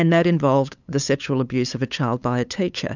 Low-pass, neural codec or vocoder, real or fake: 7.2 kHz; codec, 16 kHz, 8 kbps, FunCodec, trained on LibriTTS, 25 frames a second; fake